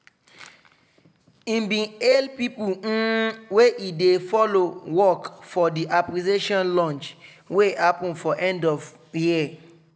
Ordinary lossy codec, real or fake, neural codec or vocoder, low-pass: none; real; none; none